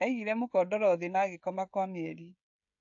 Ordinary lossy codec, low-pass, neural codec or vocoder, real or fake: AAC, 64 kbps; 7.2 kHz; codec, 16 kHz, 16 kbps, FreqCodec, smaller model; fake